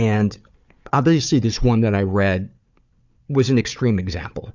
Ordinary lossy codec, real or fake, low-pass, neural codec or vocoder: Opus, 64 kbps; fake; 7.2 kHz; codec, 16 kHz, 4 kbps, FunCodec, trained on Chinese and English, 50 frames a second